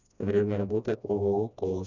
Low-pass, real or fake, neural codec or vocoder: 7.2 kHz; fake; codec, 16 kHz, 1 kbps, FreqCodec, smaller model